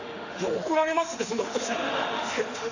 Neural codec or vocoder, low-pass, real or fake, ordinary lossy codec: autoencoder, 48 kHz, 32 numbers a frame, DAC-VAE, trained on Japanese speech; 7.2 kHz; fake; none